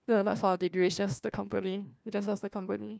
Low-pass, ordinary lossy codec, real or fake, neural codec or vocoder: none; none; fake; codec, 16 kHz, 1 kbps, FunCodec, trained on LibriTTS, 50 frames a second